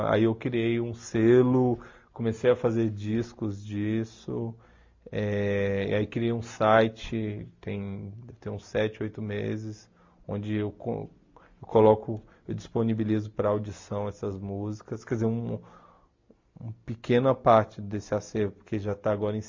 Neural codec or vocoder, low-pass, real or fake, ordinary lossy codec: none; 7.2 kHz; real; MP3, 48 kbps